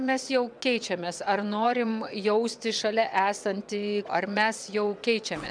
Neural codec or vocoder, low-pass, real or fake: vocoder, 22.05 kHz, 80 mel bands, WaveNeXt; 9.9 kHz; fake